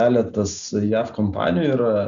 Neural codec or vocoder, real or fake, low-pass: none; real; 7.2 kHz